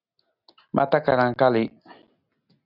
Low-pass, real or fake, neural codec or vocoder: 5.4 kHz; real; none